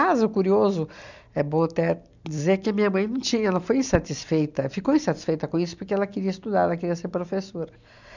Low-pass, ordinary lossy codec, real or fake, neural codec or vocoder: 7.2 kHz; none; real; none